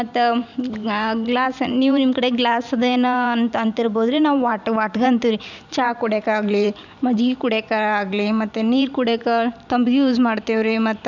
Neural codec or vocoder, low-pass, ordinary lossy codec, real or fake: vocoder, 44.1 kHz, 128 mel bands every 512 samples, BigVGAN v2; 7.2 kHz; none; fake